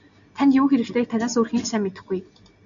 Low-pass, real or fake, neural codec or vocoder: 7.2 kHz; real; none